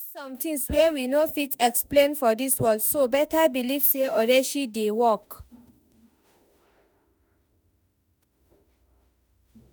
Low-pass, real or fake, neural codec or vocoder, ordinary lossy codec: none; fake; autoencoder, 48 kHz, 32 numbers a frame, DAC-VAE, trained on Japanese speech; none